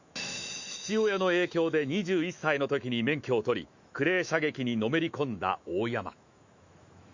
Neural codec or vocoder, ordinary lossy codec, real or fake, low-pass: autoencoder, 48 kHz, 128 numbers a frame, DAC-VAE, trained on Japanese speech; Opus, 64 kbps; fake; 7.2 kHz